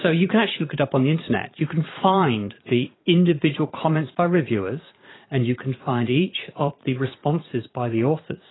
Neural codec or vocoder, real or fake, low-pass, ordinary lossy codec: vocoder, 44.1 kHz, 80 mel bands, Vocos; fake; 7.2 kHz; AAC, 16 kbps